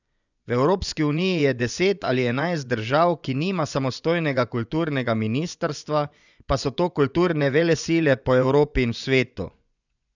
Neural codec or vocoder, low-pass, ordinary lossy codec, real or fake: vocoder, 24 kHz, 100 mel bands, Vocos; 7.2 kHz; none; fake